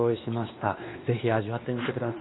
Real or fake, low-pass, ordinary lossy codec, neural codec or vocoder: fake; 7.2 kHz; AAC, 16 kbps; codec, 16 kHz, 2 kbps, X-Codec, WavLM features, trained on Multilingual LibriSpeech